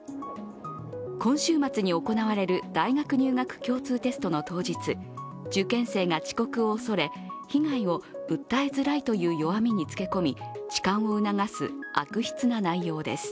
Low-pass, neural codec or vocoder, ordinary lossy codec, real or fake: none; none; none; real